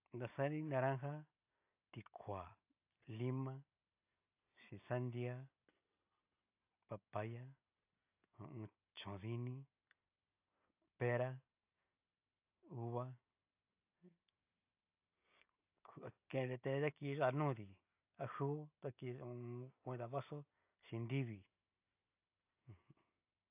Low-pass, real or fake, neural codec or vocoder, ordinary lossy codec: 3.6 kHz; real; none; AAC, 32 kbps